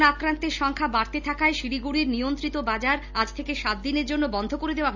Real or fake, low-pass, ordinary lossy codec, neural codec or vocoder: real; 7.2 kHz; none; none